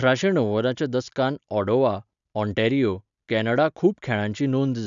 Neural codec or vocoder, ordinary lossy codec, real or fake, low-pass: none; none; real; 7.2 kHz